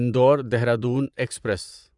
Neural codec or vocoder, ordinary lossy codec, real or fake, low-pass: vocoder, 24 kHz, 100 mel bands, Vocos; none; fake; 10.8 kHz